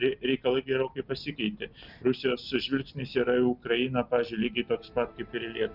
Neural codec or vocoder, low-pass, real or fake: none; 5.4 kHz; real